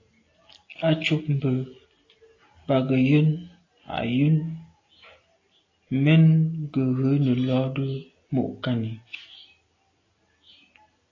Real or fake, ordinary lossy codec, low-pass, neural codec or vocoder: real; AAC, 32 kbps; 7.2 kHz; none